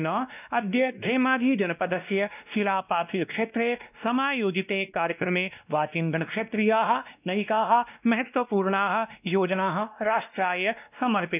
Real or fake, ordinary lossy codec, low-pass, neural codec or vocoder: fake; none; 3.6 kHz; codec, 16 kHz, 1 kbps, X-Codec, WavLM features, trained on Multilingual LibriSpeech